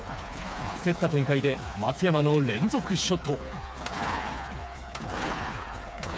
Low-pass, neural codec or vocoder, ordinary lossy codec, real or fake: none; codec, 16 kHz, 4 kbps, FreqCodec, smaller model; none; fake